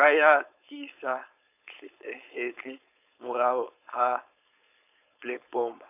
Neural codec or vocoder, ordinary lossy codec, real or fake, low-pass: codec, 16 kHz, 4.8 kbps, FACodec; none; fake; 3.6 kHz